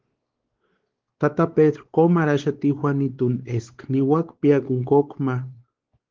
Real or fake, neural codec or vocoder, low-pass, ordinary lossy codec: fake; codec, 16 kHz, 4 kbps, X-Codec, WavLM features, trained on Multilingual LibriSpeech; 7.2 kHz; Opus, 16 kbps